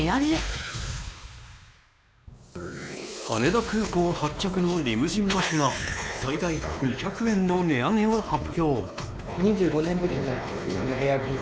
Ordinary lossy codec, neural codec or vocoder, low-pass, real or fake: none; codec, 16 kHz, 2 kbps, X-Codec, WavLM features, trained on Multilingual LibriSpeech; none; fake